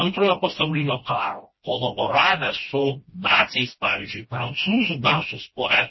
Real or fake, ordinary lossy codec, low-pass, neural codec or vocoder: fake; MP3, 24 kbps; 7.2 kHz; codec, 16 kHz, 1 kbps, FreqCodec, smaller model